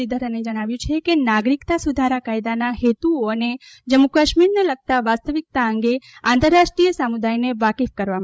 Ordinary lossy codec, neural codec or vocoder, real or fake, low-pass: none; codec, 16 kHz, 16 kbps, FreqCodec, larger model; fake; none